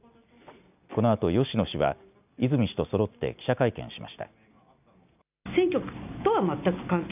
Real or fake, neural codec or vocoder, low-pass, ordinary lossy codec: real; none; 3.6 kHz; none